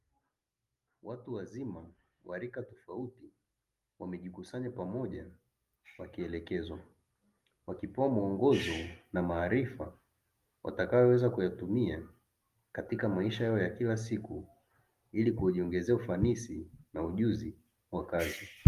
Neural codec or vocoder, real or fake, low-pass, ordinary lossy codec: none; real; 14.4 kHz; Opus, 32 kbps